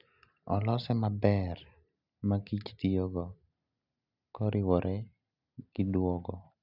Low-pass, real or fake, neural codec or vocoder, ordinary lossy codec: 5.4 kHz; real; none; none